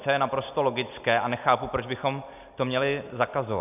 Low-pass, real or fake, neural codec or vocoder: 3.6 kHz; real; none